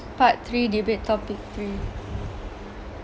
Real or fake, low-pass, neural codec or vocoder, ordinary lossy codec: real; none; none; none